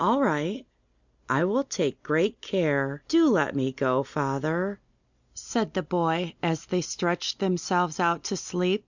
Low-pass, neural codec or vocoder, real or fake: 7.2 kHz; none; real